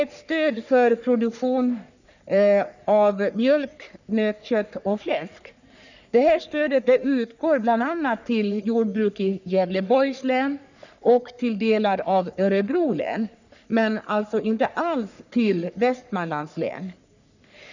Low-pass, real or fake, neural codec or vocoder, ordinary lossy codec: 7.2 kHz; fake; codec, 44.1 kHz, 3.4 kbps, Pupu-Codec; none